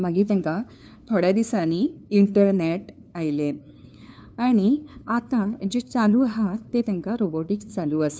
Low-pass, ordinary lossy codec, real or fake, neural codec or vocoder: none; none; fake; codec, 16 kHz, 2 kbps, FunCodec, trained on LibriTTS, 25 frames a second